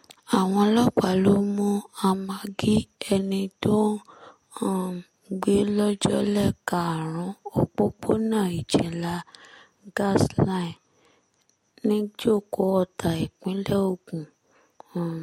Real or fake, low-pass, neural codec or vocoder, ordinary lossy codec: real; 19.8 kHz; none; MP3, 64 kbps